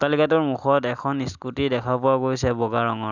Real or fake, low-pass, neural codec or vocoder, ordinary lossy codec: real; 7.2 kHz; none; none